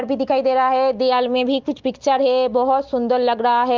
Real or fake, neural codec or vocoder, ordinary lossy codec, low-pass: real; none; Opus, 24 kbps; 7.2 kHz